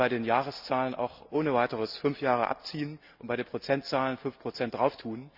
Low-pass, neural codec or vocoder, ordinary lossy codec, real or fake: 5.4 kHz; none; Opus, 64 kbps; real